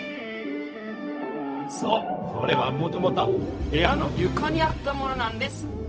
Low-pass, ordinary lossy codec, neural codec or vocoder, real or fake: none; none; codec, 16 kHz, 0.4 kbps, LongCat-Audio-Codec; fake